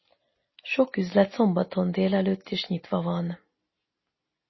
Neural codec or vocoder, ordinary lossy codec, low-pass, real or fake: none; MP3, 24 kbps; 7.2 kHz; real